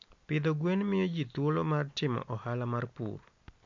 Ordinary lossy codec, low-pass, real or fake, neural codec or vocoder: MP3, 48 kbps; 7.2 kHz; real; none